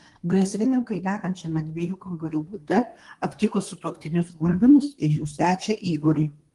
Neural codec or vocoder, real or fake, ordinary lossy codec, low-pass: codec, 24 kHz, 1 kbps, SNAC; fake; Opus, 24 kbps; 10.8 kHz